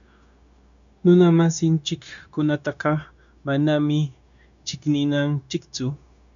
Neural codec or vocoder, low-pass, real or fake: codec, 16 kHz, 0.9 kbps, LongCat-Audio-Codec; 7.2 kHz; fake